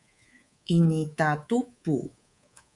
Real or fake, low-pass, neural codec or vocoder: fake; 10.8 kHz; codec, 24 kHz, 3.1 kbps, DualCodec